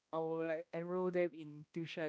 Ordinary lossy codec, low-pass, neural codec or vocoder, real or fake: none; none; codec, 16 kHz, 1 kbps, X-Codec, HuBERT features, trained on balanced general audio; fake